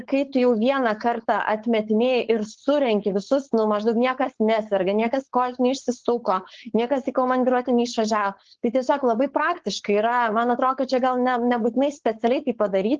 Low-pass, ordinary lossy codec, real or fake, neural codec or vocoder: 7.2 kHz; Opus, 16 kbps; fake; codec, 16 kHz, 4.8 kbps, FACodec